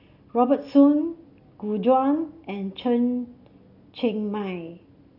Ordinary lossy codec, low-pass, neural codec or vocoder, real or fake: none; 5.4 kHz; none; real